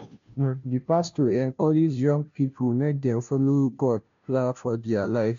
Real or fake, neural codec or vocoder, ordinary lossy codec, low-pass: fake; codec, 16 kHz, 0.5 kbps, FunCodec, trained on Chinese and English, 25 frames a second; MP3, 64 kbps; 7.2 kHz